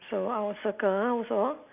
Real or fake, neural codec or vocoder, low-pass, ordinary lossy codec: real; none; 3.6 kHz; none